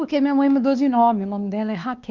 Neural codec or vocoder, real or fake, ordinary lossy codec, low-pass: codec, 16 kHz, 2 kbps, X-Codec, WavLM features, trained on Multilingual LibriSpeech; fake; Opus, 32 kbps; 7.2 kHz